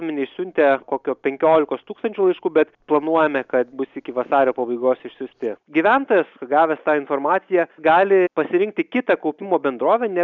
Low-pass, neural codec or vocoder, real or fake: 7.2 kHz; none; real